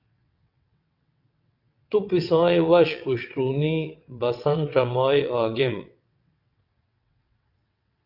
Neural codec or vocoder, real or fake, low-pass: vocoder, 22.05 kHz, 80 mel bands, WaveNeXt; fake; 5.4 kHz